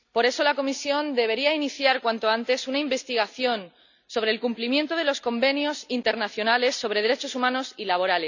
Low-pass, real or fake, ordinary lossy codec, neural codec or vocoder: 7.2 kHz; real; none; none